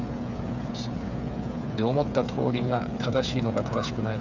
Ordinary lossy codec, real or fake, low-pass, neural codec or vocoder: none; fake; 7.2 kHz; codec, 16 kHz, 8 kbps, FreqCodec, smaller model